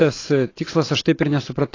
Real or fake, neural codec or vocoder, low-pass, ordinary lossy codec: real; none; 7.2 kHz; AAC, 32 kbps